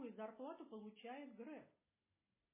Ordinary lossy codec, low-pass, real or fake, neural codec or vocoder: MP3, 16 kbps; 3.6 kHz; real; none